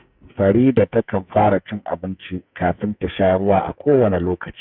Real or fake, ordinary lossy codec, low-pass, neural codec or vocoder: fake; none; 5.4 kHz; codec, 44.1 kHz, 2.6 kbps, SNAC